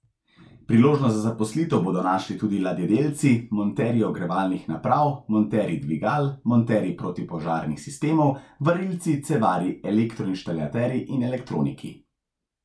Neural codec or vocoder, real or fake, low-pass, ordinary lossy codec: none; real; none; none